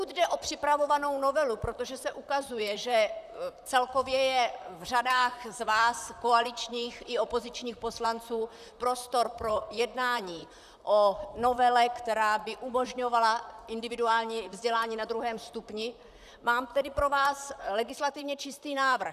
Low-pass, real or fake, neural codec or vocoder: 14.4 kHz; fake; vocoder, 44.1 kHz, 128 mel bands, Pupu-Vocoder